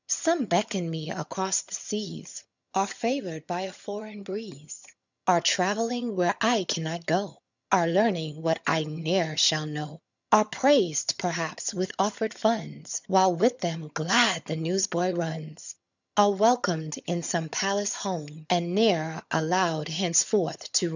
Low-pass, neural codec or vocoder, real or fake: 7.2 kHz; vocoder, 22.05 kHz, 80 mel bands, HiFi-GAN; fake